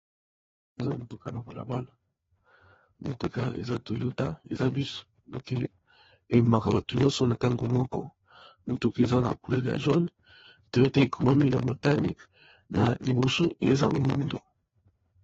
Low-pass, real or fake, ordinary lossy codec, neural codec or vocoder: 7.2 kHz; fake; AAC, 24 kbps; codec, 16 kHz, 2 kbps, FreqCodec, larger model